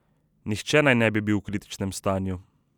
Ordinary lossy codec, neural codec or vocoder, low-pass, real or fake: none; none; 19.8 kHz; real